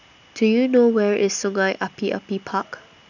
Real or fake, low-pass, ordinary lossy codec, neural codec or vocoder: real; 7.2 kHz; none; none